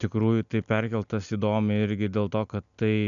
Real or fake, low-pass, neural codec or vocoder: real; 7.2 kHz; none